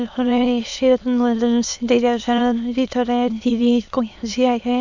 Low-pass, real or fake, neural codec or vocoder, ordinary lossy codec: 7.2 kHz; fake; autoencoder, 22.05 kHz, a latent of 192 numbers a frame, VITS, trained on many speakers; none